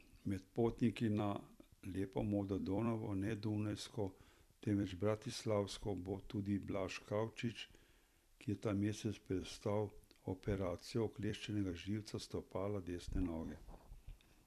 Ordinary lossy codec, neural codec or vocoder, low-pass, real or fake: none; vocoder, 44.1 kHz, 128 mel bands every 256 samples, BigVGAN v2; 14.4 kHz; fake